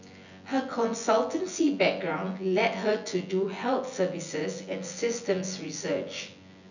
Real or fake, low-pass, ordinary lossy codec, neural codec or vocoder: fake; 7.2 kHz; none; vocoder, 24 kHz, 100 mel bands, Vocos